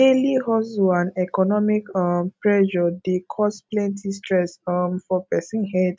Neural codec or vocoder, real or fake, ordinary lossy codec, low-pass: none; real; none; none